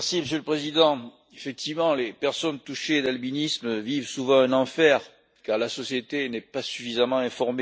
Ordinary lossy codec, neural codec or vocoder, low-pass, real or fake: none; none; none; real